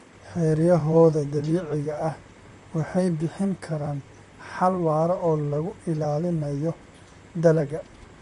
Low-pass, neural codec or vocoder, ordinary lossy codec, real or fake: 14.4 kHz; vocoder, 44.1 kHz, 128 mel bands, Pupu-Vocoder; MP3, 48 kbps; fake